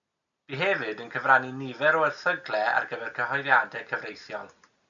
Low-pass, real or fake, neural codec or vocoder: 7.2 kHz; real; none